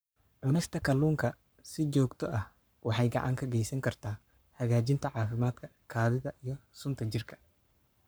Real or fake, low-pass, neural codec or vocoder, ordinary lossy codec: fake; none; codec, 44.1 kHz, 7.8 kbps, Pupu-Codec; none